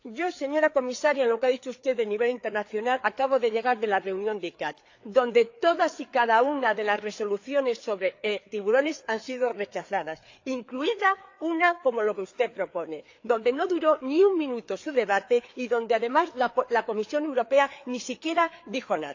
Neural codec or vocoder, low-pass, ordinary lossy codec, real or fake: codec, 16 kHz, 4 kbps, FreqCodec, larger model; 7.2 kHz; AAC, 48 kbps; fake